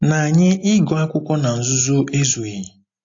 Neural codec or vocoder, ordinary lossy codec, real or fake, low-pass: none; AAC, 48 kbps; real; 7.2 kHz